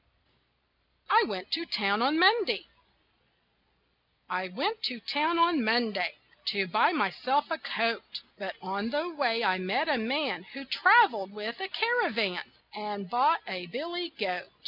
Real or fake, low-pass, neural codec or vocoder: real; 5.4 kHz; none